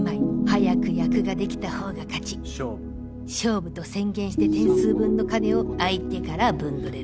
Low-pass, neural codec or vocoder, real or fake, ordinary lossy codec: none; none; real; none